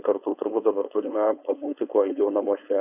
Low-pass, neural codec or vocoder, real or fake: 3.6 kHz; codec, 16 kHz, 4.8 kbps, FACodec; fake